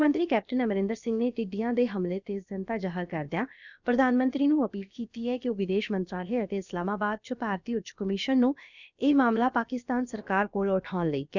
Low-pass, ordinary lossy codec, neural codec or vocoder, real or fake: 7.2 kHz; none; codec, 16 kHz, about 1 kbps, DyCAST, with the encoder's durations; fake